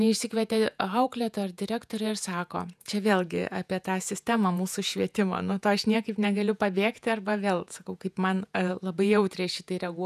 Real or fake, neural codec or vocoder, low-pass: fake; vocoder, 48 kHz, 128 mel bands, Vocos; 14.4 kHz